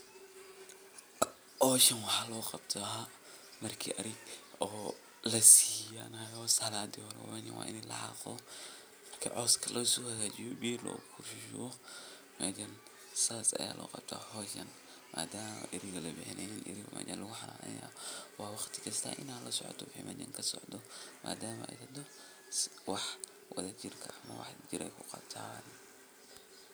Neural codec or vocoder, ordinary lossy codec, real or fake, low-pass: none; none; real; none